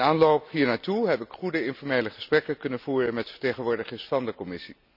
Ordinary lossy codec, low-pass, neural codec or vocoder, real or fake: none; 5.4 kHz; none; real